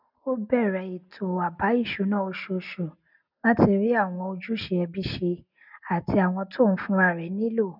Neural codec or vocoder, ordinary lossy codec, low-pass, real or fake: none; none; 5.4 kHz; real